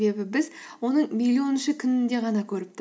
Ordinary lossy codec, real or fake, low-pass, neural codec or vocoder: none; real; none; none